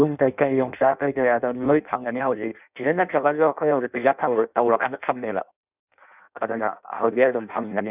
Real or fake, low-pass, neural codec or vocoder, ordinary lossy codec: fake; 3.6 kHz; codec, 16 kHz in and 24 kHz out, 0.6 kbps, FireRedTTS-2 codec; none